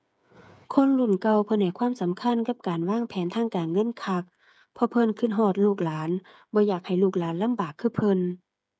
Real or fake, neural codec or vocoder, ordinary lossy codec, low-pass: fake; codec, 16 kHz, 8 kbps, FreqCodec, smaller model; none; none